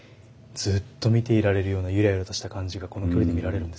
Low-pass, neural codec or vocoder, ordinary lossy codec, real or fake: none; none; none; real